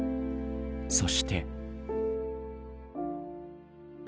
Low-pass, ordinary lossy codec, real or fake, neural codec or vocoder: none; none; real; none